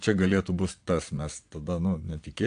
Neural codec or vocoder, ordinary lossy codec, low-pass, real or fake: vocoder, 22.05 kHz, 80 mel bands, Vocos; AAC, 64 kbps; 9.9 kHz; fake